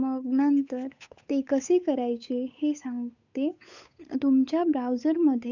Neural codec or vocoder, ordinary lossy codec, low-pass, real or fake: codec, 16 kHz, 8 kbps, FunCodec, trained on Chinese and English, 25 frames a second; none; 7.2 kHz; fake